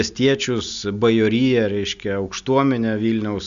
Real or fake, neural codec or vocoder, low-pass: real; none; 7.2 kHz